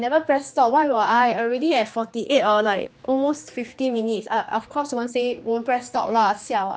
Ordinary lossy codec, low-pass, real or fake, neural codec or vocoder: none; none; fake; codec, 16 kHz, 2 kbps, X-Codec, HuBERT features, trained on general audio